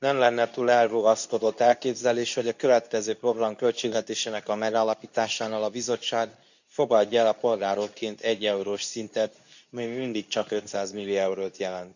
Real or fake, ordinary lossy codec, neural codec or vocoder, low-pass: fake; none; codec, 24 kHz, 0.9 kbps, WavTokenizer, medium speech release version 2; 7.2 kHz